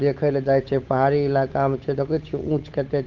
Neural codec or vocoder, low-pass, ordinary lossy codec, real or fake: codec, 16 kHz, 8 kbps, FunCodec, trained on Chinese and English, 25 frames a second; 7.2 kHz; Opus, 32 kbps; fake